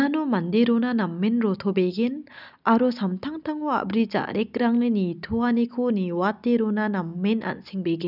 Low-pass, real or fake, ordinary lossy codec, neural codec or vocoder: 5.4 kHz; real; none; none